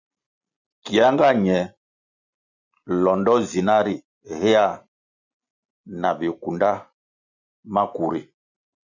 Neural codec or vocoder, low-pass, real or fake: none; 7.2 kHz; real